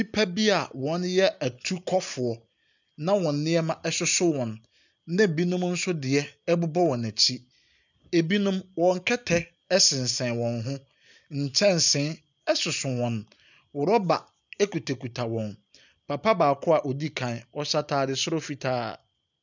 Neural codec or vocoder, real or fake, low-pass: none; real; 7.2 kHz